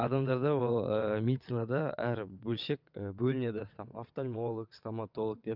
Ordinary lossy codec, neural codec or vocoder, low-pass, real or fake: none; vocoder, 22.05 kHz, 80 mel bands, WaveNeXt; 5.4 kHz; fake